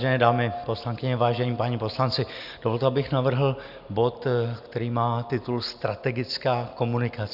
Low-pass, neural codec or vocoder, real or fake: 5.4 kHz; none; real